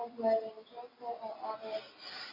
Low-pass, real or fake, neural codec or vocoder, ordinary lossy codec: 5.4 kHz; real; none; AAC, 24 kbps